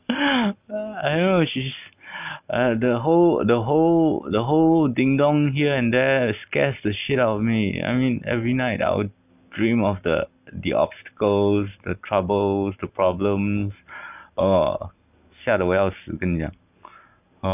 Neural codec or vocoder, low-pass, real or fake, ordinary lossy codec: none; 3.6 kHz; real; none